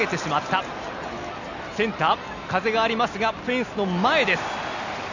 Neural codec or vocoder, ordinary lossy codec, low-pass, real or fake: none; none; 7.2 kHz; real